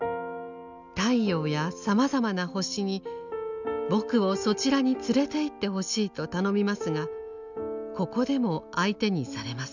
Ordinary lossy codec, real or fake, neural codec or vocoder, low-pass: none; real; none; 7.2 kHz